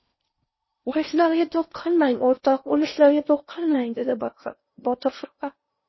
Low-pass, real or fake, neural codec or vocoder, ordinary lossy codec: 7.2 kHz; fake; codec, 16 kHz in and 24 kHz out, 0.6 kbps, FocalCodec, streaming, 4096 codes; MP3, 24 kbps